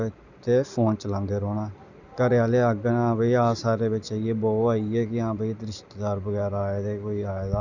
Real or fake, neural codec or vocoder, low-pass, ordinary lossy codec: real; none; 7.2 kHz; none